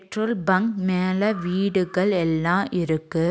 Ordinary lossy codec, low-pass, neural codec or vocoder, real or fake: none; none; none; real